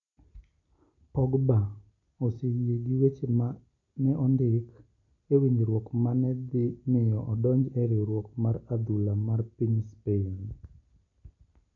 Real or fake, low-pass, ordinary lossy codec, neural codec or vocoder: real; 7.2 kHz; none; none